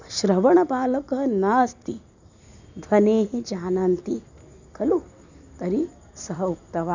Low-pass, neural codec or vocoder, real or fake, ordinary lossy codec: 7.2 kHz; none; real; none